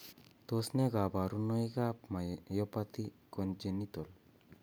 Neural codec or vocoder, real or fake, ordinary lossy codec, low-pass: none; real; none; none